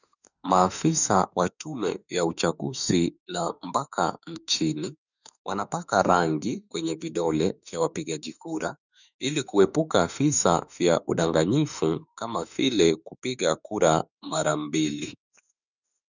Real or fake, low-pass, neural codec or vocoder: fake; 7.2 kHz; autoencoder, 48 kHz, 32 numbers a frame, DAC-VAE, trained on Japanese speech